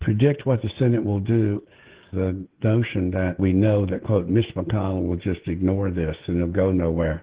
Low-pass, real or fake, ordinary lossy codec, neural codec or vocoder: 3.6 kHz; fake; Opus, 16 kbps; codec, 16 kHz, 16 kbps, FreqCodec, smaller model